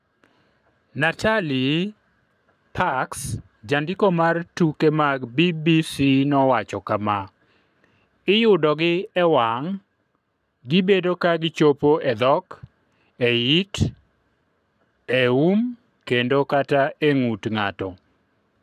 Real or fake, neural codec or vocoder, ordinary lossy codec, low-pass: fake; codec, 44.1 kHz, 7.8 kbps, Pupu-Codec; none; 14.4 kHz